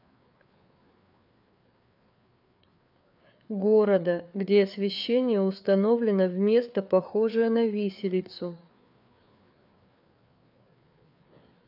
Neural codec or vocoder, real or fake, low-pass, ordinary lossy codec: codec, 16 kHz, 4 kbps, FreqCodec, larger model; fake; 5.4 kHz; none